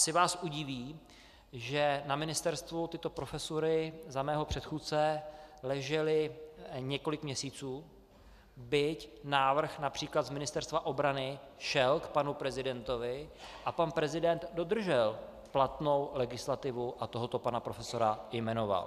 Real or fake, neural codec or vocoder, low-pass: real; none; 14.4 kHz